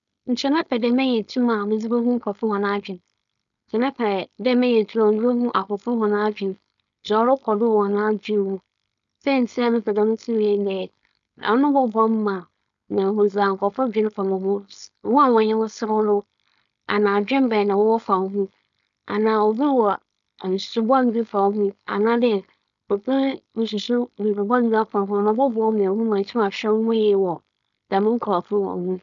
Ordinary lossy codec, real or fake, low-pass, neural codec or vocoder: none; fake; 7.2 kHz; codec, 16 kHz, 4.8 kbps, FACodec